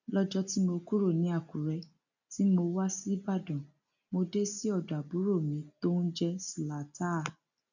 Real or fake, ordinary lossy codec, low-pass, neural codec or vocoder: real; none; 7.2 kHz; none